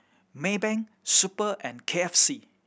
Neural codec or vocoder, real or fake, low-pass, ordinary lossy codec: none; real; none; none